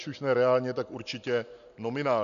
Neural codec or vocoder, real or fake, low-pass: none; real; 7.2 kHz